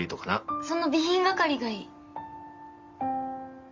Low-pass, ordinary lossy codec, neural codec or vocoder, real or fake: 7.2 kHz; Opus, 32 kbps; none; real